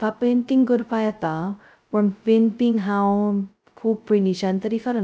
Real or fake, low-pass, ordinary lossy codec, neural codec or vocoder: fake; none; none; codec, 16 kHz, 0.2 kbps, FocalCodec